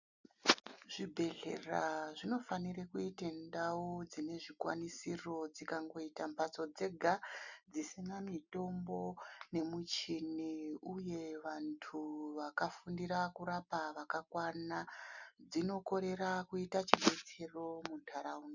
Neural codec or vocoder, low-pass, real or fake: none; 7.2 kHz; real